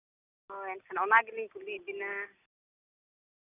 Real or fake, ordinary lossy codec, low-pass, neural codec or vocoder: real; none; 3.6 kHz; none